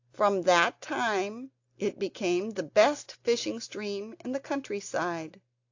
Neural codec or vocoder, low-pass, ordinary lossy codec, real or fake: none; 7.2 kHz; AAC, 48 kbps; real